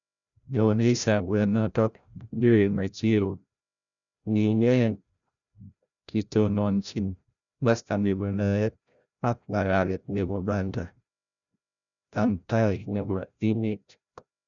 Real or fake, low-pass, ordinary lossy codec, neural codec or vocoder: fake; 7.2 kHz; none; codec, 16 kHz, 0.5 kbps, FreqCodec, larger model